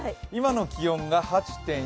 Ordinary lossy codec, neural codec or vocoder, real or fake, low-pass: none; none; real; none